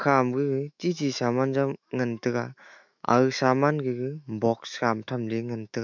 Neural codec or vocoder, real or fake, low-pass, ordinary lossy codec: autoencoder, 48 kHz, 128 numbers a frame, DAC-VAE, trained on Japanese speech; fake; 7.2 kHz; none